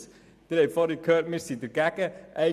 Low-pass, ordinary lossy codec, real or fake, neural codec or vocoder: 14.4 kHz; none; fake; vocoder, 44.1 kHz, 128 mel bands every 512 samples, BigVGAN v2